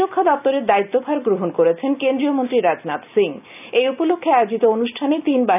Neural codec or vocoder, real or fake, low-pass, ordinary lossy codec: none; real; 3.6 kHz; none